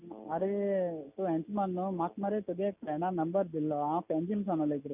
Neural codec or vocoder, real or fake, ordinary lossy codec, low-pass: none; real; none; 3.6 kHz